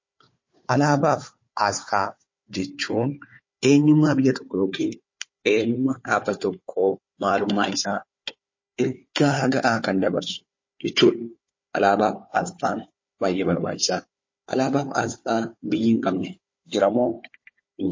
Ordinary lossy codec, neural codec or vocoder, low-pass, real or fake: MP3, 32 kbps; codec, 16 kHz, 4 kbps, FunCodec, trained on Chinese and English, 50 frames a second; 7.2 kHz; fake